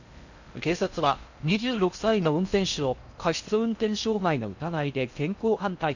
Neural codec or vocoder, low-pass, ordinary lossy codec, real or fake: codec, 16 kHz in and 24 kHz out, 0.6 kbps, FocalCodec, streaming, 2048 codes; 7.2 kHz; none; fake